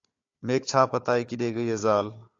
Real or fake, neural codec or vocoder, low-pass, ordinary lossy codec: fake; codec, 16 kHz, 4 kbps, FunCodec, trained on Chinese and English, 50 frames a second; 7.2 kHz; AAC, 48 kbps